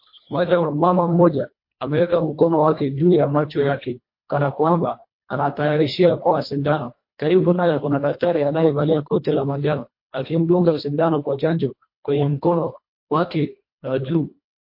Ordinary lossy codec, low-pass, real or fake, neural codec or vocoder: MP3, 32 kbps; 5.4 kHz; fake; codec, 24 kHz, 1.5 kbps, HILCodec